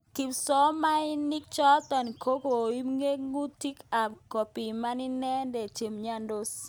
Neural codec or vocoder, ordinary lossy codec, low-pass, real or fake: none; none; none; real